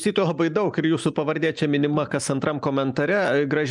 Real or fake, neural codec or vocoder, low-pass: real; none; 10.8 kHz